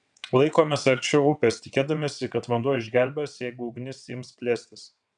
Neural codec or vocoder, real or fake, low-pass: vocoder, 22.05 kHz, 80 mel bands, WaveNeXt; fake; 9.9 kHz